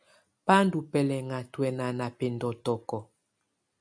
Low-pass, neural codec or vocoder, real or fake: 9.9 kHz; none; real